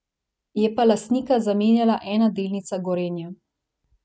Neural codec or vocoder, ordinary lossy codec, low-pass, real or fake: none; none; none; real